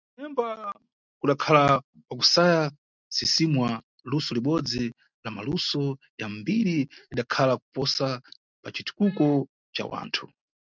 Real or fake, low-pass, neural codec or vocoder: real; 7.2 kHz; none